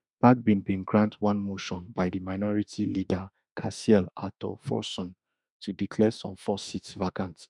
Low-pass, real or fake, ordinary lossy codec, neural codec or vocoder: 10.8 kHz; fake; none; autoencoder, 48 kHz, 32 numbers a frame, DAC-VAE, trained on Japanese speech